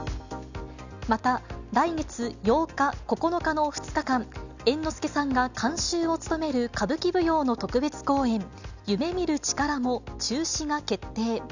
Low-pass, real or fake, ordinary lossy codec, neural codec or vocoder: 7.2 kHz; real; none; none